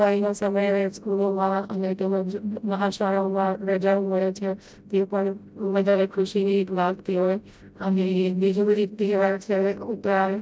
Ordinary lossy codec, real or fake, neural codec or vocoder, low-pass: none; fake; codec, 16 kHz, 0.5 kbps, FreqCodec, smaller model; none